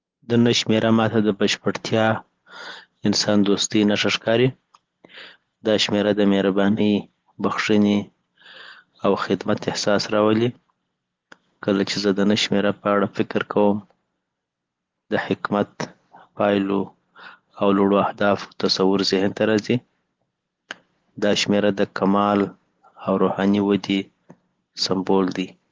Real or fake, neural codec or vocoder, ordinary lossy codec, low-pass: real; none; Opus, 16 kbps; 7.2 kHz